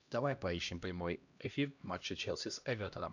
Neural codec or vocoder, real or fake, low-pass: codec, 16 kHz, 1 kbps, X-Codec, HuBERT features, trained on LibriSpeech; fake; 7.2 kHz